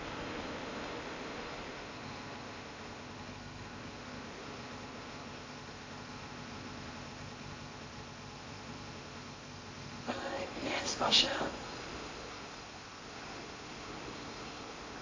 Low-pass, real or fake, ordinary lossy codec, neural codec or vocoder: 7.2 kHz; fake; AAC, 32 kbps; codec, 16 kHz in and 24 kHz out, 0.8 kbps, FocalCodec, streaming, 65536 codes